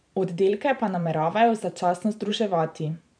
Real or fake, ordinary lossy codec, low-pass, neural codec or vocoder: fake; none; 9.9 kHz; vocoder, 44.1 kHz, 128 mel bands every 512 samples, BigVGAN v2